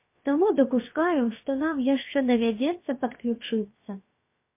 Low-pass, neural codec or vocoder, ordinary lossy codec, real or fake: 3.6 kHz; codec, 16 kHz, about 1 kbps, DyCAST, with the encoder's durations; MP3, 24 kbps; fake